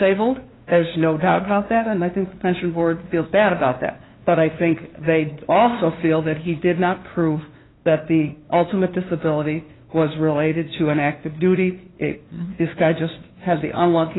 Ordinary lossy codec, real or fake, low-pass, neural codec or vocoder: AAC, 16 kbps; fake; 7.2 kHz; codec, 16 kHz, 2 kbps, FunCodec, trained on LibriTTS, 25 frames a second